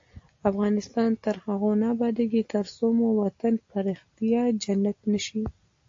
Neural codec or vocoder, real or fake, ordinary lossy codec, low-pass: none; real; AAC, 48 kbps; 7.2 kHz